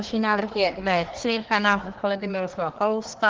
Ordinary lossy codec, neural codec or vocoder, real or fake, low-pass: Opus, 16 kbps; codec, 24 kHz, 1 kbps, SNAC; fake; 7.2 kHz